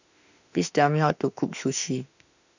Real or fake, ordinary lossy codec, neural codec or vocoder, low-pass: fake; none; autoencoder, 48 kHz, 32 numbers a frame, DAC-VAE, trained on Japanese speech; 7.2 kHz